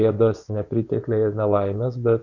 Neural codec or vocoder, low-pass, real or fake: vocoder, 24 kHz, 100 mel bands, Vocos; 7.2 kHz; fake